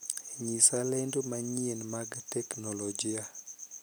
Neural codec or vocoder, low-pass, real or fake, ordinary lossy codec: none; none; real; none